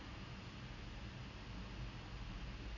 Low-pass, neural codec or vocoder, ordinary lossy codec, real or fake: 7.2 kHz; none; none; real